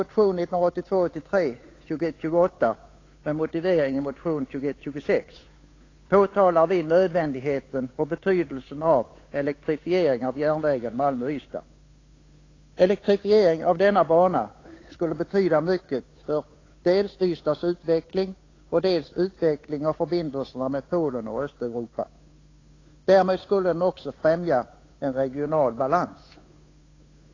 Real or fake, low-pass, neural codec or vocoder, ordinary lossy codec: fake; 7.2 kHz; codec, 16 kHz, 8 kbps, FunCodec, trained on Chinese and English, 25 frames a second; AAC, 32 kbps